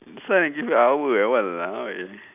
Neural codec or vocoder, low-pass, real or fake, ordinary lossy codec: none; 3.6 kHz; real; none